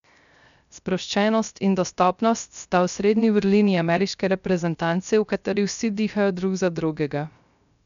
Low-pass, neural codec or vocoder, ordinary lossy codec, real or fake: 7.2 kHz; codec, 16 kHz, 0.3 kbps, FocalCodec; none; fake